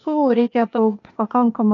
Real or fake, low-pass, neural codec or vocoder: fake; 7.2 kHz; codec, 16 kHz, 0.8 kbps, ZipCodec